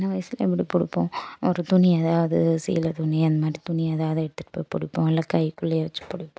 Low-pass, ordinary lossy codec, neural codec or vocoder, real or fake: none; none; none; real